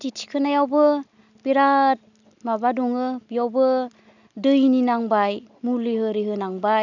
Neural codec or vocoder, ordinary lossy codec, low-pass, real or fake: none; none; 7.2 kHz; real